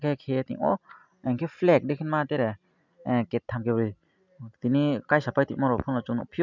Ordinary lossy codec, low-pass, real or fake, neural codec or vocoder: none; 7.2 kHz; real; none